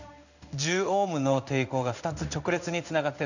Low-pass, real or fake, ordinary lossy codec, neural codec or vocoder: 7.2 kHz; fake; none; codec, 16 kHz in and 24 kHz out, 1 kbps, XY-Tokenizer